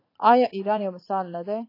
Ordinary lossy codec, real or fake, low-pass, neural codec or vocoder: AAC, 32 kbps; fake; 5.4 kHz; vocoder, 44.1 kHz, 80 mel bands, Vocos